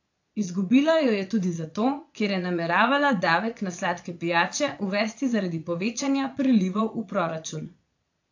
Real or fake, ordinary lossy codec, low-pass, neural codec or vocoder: fake; AAC, 48 kbps; 7.2 kHz; vocoder, 22.05 kHz, 80 mel bands, Vocos